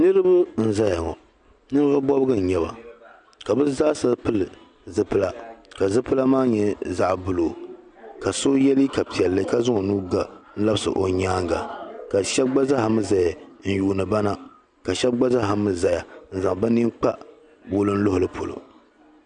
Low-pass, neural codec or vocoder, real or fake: 9.9 kHz; none; real